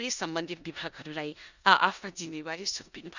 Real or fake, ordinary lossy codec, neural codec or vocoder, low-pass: fake; none; codec, 16 kHz in and 24 kHz out, 0.9 kbps, LongCat-Audio-Codec, four codebook decoder; 7.2 kHz